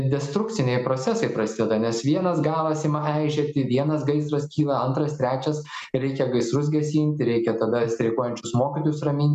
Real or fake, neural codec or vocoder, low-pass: real; none; 14.4 kHz